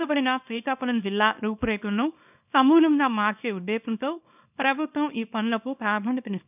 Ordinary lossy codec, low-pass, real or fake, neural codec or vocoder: none; 3.6 kHz; fake; codec, 24 kHz, 0.9 kbps, WavTokenizer, small release